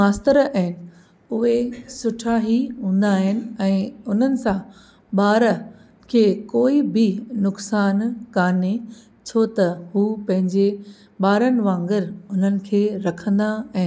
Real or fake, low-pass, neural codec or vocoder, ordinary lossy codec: real; none; none; none